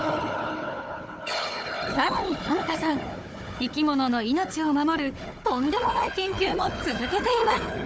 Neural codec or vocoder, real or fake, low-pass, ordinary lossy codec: codec, 16 kHz, 4 kbps, FunCodec, trained on Chinese and English, 50 frames a second; fake; none; none